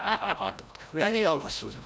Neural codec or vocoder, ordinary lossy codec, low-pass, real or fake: codec, 16 kHz, 0.5 kbps, FreqCodec, larger model; none; none; fake